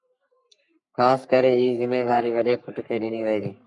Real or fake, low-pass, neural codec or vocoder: fake; 10.8 kHz; codec, 44.1 kHz, 3.4 kbps, Pupu-Codec